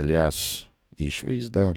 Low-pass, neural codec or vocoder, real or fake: 19.8 kHz; codec, 44.1 kHz, 2.6 kbps, DAC; fake